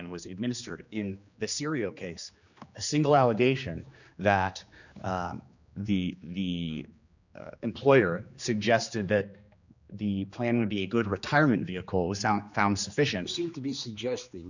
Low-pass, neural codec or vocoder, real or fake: 7.2 kHz; codec, 16 kHz, 2 kbps, X-Codec, HuBERT features, trained on general audio; fake